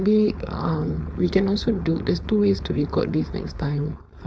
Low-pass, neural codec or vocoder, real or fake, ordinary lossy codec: none; codec, 16 kHz, 4.8 kbps, FACodec; fake; none